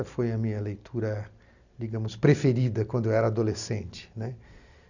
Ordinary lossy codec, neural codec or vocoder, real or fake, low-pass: none; none; real; 7.2 kHz